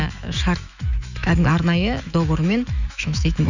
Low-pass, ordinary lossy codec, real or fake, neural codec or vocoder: 7.2 kHz; none; fake; autoencoder, 48 kHz, 128 numbers a frame, DAC-VAE, trained on Japanese speech